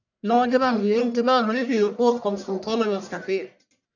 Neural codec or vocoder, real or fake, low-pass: codec, 44.1 kHz, 1.7 kbps, Pupu-Codec; fake; 7.2 kHz